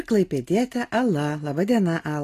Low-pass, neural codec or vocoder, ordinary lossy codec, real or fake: 14.4 kHz; none; AAC, 48 kbps; real